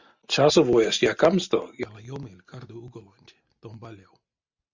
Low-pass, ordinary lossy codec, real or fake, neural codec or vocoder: 7.2 kHz; Opus, 64 kbps; real; none